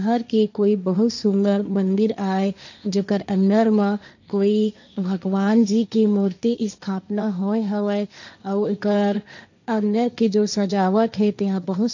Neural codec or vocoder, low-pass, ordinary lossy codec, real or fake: codec, 16 kHz, 1.1 kbps, Voila-Tokenizer; 7.2 kHz; none; fake